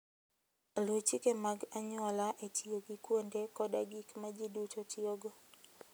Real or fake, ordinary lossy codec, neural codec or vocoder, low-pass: real; none; none; none